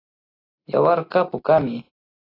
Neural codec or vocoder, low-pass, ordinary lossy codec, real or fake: none; 5.4 kHz; AAC, 24 kbps; real